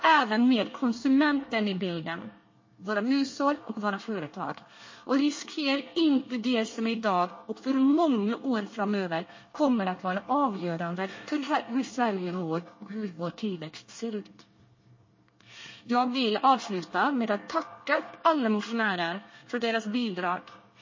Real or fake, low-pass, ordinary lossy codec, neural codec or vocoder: fake; 7.2 kHz; MP3, 32 kbps; codec, 24 kHz, 1 kbps, SNAC